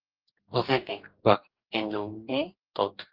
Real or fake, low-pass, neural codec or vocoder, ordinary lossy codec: fake; 5.4 kHz; codec, 44.1 kHz, 2.6 kbps, DAC; Opus, 32 kbps